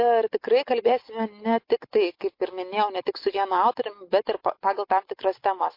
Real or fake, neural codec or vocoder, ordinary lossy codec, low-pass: real; none; MP3, 32 kbps; 5.4 kHz